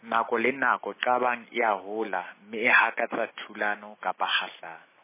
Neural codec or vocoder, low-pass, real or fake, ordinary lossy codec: none; 3.6 kHz; real; MP3, 16 kbps